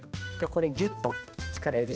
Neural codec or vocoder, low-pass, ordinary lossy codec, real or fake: codec, 16 kHz, 1 kbps, X-Codec, HuBERT features, trained on balanced general audio; none; none; fake